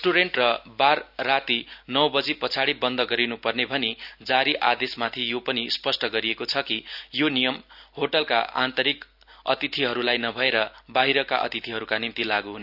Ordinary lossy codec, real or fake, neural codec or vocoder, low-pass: none; real; none; 5.4 kHz